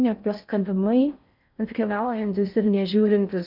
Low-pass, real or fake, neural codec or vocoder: 5.4 kHz; fake; codec, 16 kHz in and 24 kHz out, 0.6 kbps, FocalCodec, streaming, 4096 codes